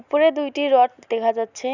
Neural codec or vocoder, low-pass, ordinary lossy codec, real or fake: none; 7.2 kHz; none; real